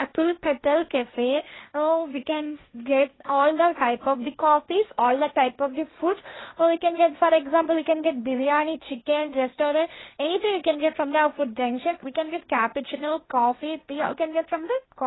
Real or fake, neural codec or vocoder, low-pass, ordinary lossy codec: fake; codec, 16 kHz, 1.1 kbps, Voila-Tokenizer; 7.2 kHz; AAC, 16 kbps